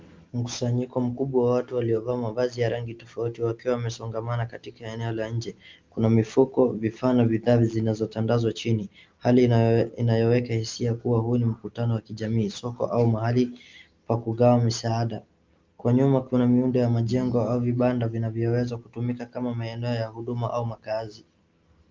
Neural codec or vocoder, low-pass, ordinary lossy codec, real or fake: none; 7.2 kHz; Opus, 32 kbps; real